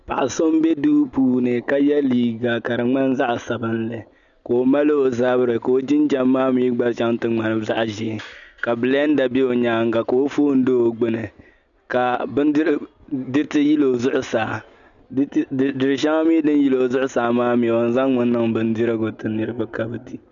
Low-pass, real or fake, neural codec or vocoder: 7.2 kHz; real; none